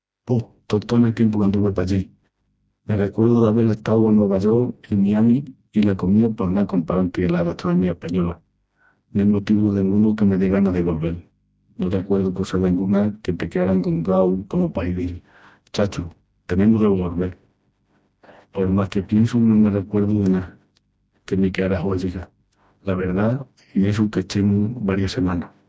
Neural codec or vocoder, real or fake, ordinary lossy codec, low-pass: codec, 16 kHz, 1 kbps, FreqCodec, smaller model; fake; none; none